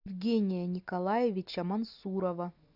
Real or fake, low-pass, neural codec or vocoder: real; 5.4 kHz; none